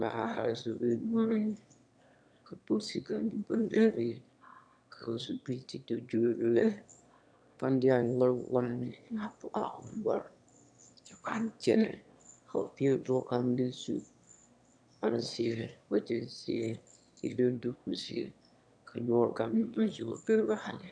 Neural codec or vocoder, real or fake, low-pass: autoencoder, 22.05 kHz, a latent of 192 numbers a frame, VITS, trained on one speaker; fake; 9.9 kHz